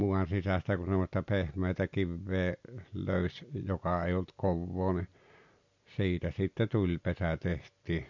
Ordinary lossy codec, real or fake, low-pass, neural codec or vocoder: MP3, 48 kbps; real; 7.2 kHz; none